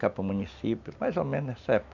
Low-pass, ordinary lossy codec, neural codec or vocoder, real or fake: 7.2 kHz; none; none; real